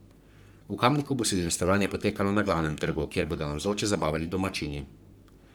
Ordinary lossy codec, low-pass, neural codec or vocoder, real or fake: none; none; codec, 44.1 kHz, 3.4 kbps, Pupu-Codec; fake